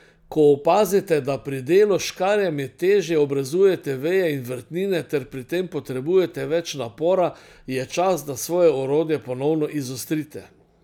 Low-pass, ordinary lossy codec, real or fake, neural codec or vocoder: 19.8 kHz; none; real; none